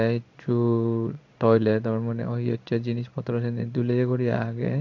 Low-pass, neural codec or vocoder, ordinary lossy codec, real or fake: 7.2 kHz; codec, 16 kHz in and 24 kHz out, 1 kbps, XY-Tokenizer; none; fake